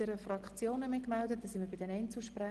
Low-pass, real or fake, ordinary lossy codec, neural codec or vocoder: 10.8 kHz; real; Opus, 16 kbps; none